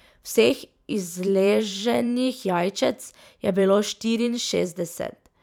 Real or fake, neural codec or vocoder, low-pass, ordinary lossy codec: fake; vocoder, 44.1 kHz, 128 mel bands every 512 samples, BigVGAN v2; 19.8 kHz; none